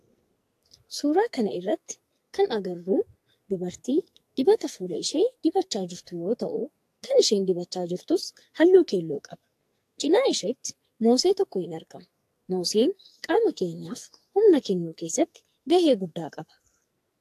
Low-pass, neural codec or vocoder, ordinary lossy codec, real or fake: 14.4 kHz; codec, 44.1 kHz, 2.6 kbps, SNAC; AAC, 64 kbps; fake